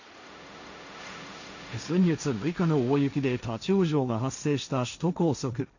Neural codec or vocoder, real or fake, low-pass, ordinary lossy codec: codec, 16 kHz, 1.1 kbps, Voila-Tokenizer; fake; 7.2 kHz; none